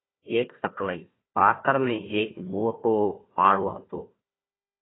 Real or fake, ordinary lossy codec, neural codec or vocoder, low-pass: fake; AAC, 16 kbps; codec, 16 kHz, 1 kbps, FunCodec, trained on Chinese and English, 50 frames a second; 7.2 kHz